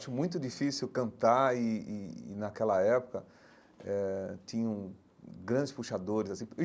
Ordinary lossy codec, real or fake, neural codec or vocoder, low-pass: none; real; none; none